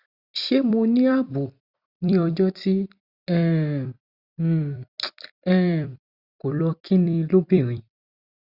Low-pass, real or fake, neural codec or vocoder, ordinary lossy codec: 5.4 kHz; fake; vocoder, 44.1 kHz, 128 mel bands every 256 samples, BigVGAN v2; Opus, 64 kbps